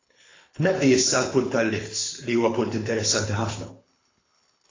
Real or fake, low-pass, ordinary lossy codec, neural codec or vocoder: fake; 7.2 kHz; AAC, 32 kbps; codec, 24 kHz, 6 kbps, HILCodec